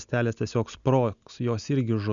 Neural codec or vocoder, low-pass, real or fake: none; 7.2 kHz; real